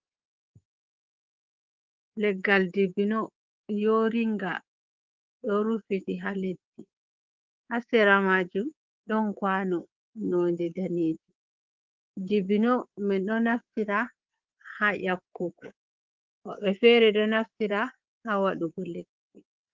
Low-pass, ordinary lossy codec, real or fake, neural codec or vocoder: 7.2 kHz; Opus, 16 kbps; fake; codec, 24 kHz, 3.1 kbps, DualCodec